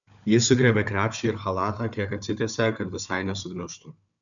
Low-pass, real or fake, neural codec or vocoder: 7.2 kHz; fake; codec, 16 kHz, 4 kbps, FunCodec, trained on Chinese and English, 50 frames a second